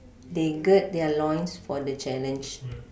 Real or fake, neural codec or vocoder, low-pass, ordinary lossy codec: real; none; none; none